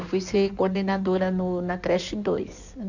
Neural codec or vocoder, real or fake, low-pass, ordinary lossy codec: codec, 16 kHz in and 24 kHz out, 2.2 kbps, FireRedTTS-2 codec; fake; 7.2 kHz; none